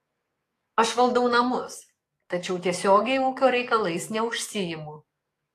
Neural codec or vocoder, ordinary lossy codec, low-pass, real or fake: codec, 44.1 kHz, 7.8 kbps, DAC; AAC, 48 kbps; 14.4 kHz; fake